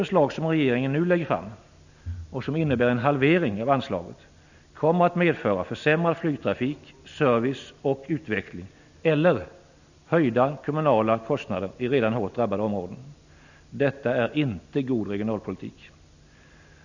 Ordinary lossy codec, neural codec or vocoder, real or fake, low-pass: none; none; real; 7.2 kHz